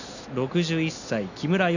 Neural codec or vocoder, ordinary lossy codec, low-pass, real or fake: none; none; 7.2 kHz; real